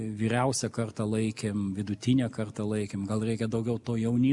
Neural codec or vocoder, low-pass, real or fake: none; 10.8 kHz; real